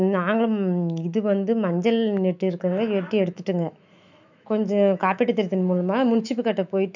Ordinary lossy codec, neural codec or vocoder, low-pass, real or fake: none; autoencoder, 48 kHz, 128 numbers a frame, DAC-VAE, trained on Japanese speech; 7.2 kHz; fake